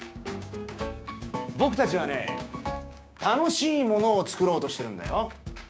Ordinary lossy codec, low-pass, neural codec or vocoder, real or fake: none; none; codec, 16 kHz, 6 kbps, DAC; fake